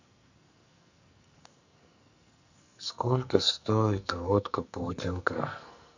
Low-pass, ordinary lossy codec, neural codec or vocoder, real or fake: 7.2 kHz; none; codec, 44.1 kHz, 2.6 kbps, SNAC; fake